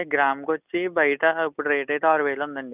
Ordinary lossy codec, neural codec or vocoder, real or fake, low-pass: none; none; real; 3.6 kHz